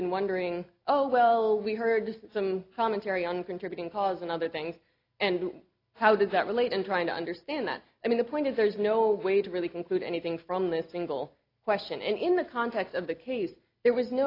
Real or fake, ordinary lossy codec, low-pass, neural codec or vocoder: real; AAC, 32 kbps; 5.4 kHz; none